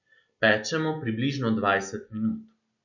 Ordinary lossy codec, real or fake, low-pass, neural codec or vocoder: none; real; 7.2 kHz; none